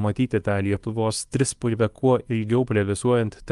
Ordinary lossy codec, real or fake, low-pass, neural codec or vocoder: Opus, 32 kbps; fake; 10.8 kHz; codec, 24 kHz, 0.9 kbps, WavTokenizer, small release